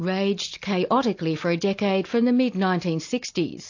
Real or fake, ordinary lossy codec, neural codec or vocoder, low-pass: real; AAC, 48 kbps; none; 7.2 kHz